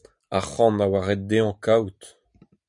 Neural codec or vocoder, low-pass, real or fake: none; 10.8 kHz; real